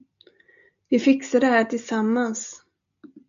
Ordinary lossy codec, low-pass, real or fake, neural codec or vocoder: AAC, 96 kbps; 7.2 kHz; real; none